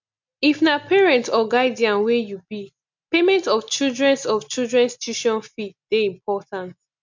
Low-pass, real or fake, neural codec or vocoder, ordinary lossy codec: 7.2 kHz; real; none; MP3, 64 kbps